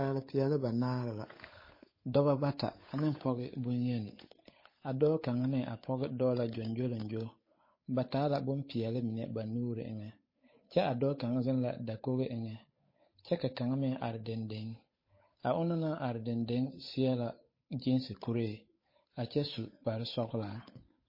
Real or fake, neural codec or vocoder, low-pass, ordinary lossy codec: fake; codec, 16 kHz, 8 kbps, FunCodec, trained on Chinese and English, 25 frames a second; 5.4 kHz; MP3, 24 kbps